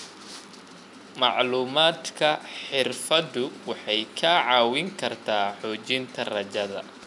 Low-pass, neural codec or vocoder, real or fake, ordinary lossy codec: 10.8 kHz; none; real; none